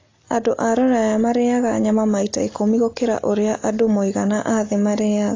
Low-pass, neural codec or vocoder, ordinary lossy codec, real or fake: 7.2 kHz; none; AAC, 32 kbps; real